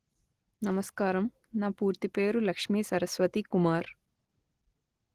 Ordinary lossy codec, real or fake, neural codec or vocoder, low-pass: Opus, 16 kbps; real; none; 14.4 kHz